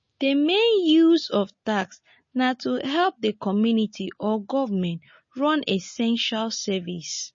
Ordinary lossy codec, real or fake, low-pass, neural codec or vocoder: MP3, 32 kbps; real; 7.2 kHz; none